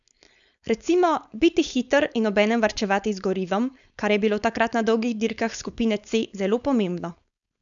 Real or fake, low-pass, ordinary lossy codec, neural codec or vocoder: fake; 7.2 kHz; none; codec, 16 kHz, 4.8 kbps, FACodec